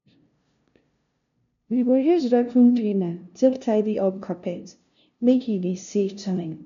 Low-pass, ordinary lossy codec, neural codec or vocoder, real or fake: 7.2 kHz; none; codec, 16 kHz, 0.5 kbps, FunCodec, trained on LibriTTS, 25 frames a second; fake